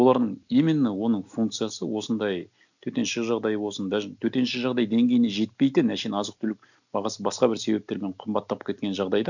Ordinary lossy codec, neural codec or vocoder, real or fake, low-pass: none; none; real; 7.2 kHz